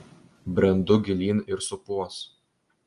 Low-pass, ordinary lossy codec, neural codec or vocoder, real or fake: 10.8 kHz; Opus, 32 kbps; none; real